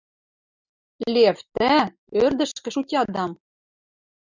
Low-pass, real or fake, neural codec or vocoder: 7.2 kHz; real; none